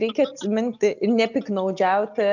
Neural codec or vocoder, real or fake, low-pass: none; real; 7.2 kHz